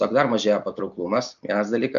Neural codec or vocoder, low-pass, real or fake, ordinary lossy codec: none; 7.2 kHz; real; AAC, 96 kbps